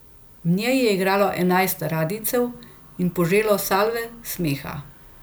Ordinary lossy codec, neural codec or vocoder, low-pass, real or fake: none; none; none; real